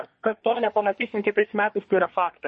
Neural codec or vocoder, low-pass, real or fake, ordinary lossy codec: codec, 24 kHz, 1 kbps, SNAC; 10.8 kHz; fake; MP3, 32 kbps